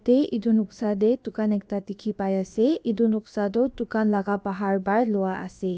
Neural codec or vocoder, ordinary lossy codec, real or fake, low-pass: codec, 16 kHz, about 1 kbps, DyCAST, with the encoder's durations; none; fake; none